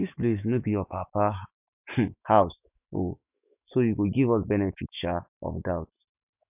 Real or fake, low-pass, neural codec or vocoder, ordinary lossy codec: fake; 3.6 kHz; autoencoder, 48 kHz, 128 numbers a frame, DAC-VAE, trained on Japanese speech; none